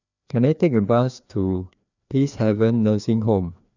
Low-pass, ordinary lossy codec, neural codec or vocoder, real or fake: 7.2 kHz; none; codec, 16 kHz, 2 kbps, FreqCodec, larger model; fake